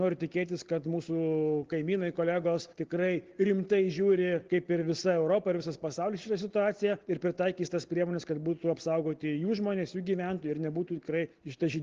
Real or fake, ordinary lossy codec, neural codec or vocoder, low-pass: real; Opus, 16 kbps; none; 7.2 kHz